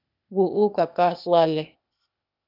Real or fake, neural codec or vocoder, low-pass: fake; codec, 16 kHz, 0.8 kbps, ZipCodec; 5.4 kHz